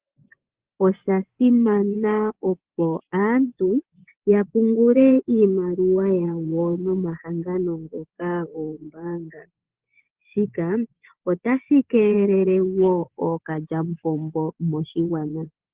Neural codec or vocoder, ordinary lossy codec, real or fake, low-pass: vocoder, 24 kHz, 100 mel bands, Vocos; Opus, 16 kbps; fake; 3.6 kHz